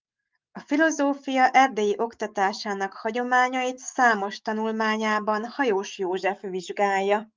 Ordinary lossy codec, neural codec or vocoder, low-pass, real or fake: Opus, 24 kbps; autoencoder, 48 kHz, 128 numbers a frame, DAC-VAE, trained on Japanese speech; 7.2 kHz; fake